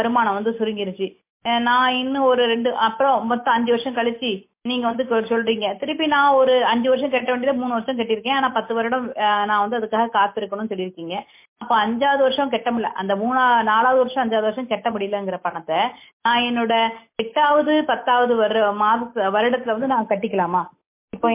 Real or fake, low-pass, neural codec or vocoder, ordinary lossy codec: real; 3.6 kHz; none; MP3, 24 kbps